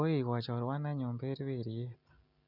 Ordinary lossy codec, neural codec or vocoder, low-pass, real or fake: none; none; 5.4 kHz; real